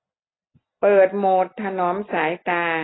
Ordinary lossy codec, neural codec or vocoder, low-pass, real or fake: AAC, 16 kbps; none; 7.2 kHz; real